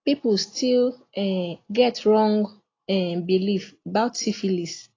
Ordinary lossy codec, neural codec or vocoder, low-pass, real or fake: AAC, 32 kbps; none; 7.2 kHz; real